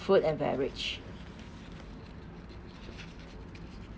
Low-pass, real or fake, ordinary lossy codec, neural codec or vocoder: none; real; none; none